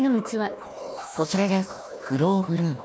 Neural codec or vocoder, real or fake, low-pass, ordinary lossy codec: codec, 16 kHz, 1 kbps, FunCodec, trained on Chinese and English, 50 frames a second; fake; none; none